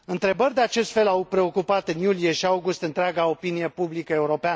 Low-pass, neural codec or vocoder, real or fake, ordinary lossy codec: none; none; real; none